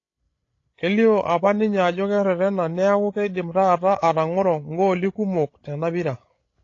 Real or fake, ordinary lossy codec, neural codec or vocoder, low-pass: fake; AAC, 32 kbps; codec, 16 kHz, 16 kbps, FreqCodec, larger model; 7.2 kHz